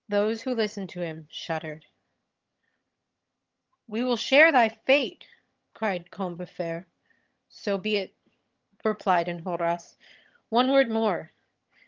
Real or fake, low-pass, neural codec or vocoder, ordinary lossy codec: fake; 7.2 kHz; vocoder, 22.05 kHz, 80 mel bands, HiFi-GAN; Opus, 32 kbps